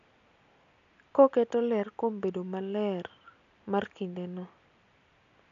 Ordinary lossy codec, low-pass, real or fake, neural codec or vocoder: none; 7.2 kHz; real; none